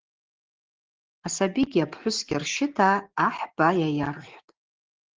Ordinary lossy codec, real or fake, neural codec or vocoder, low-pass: Opus, 16 kbps; real; none; 7.2 kHz